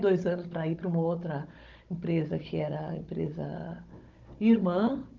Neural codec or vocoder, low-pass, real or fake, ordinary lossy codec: codec, 16 kHz, 16 kbps, FunCodec, trained on Chinese and English, 50 frames a second; 7.2 kHz; fake; Opus, 32 kbps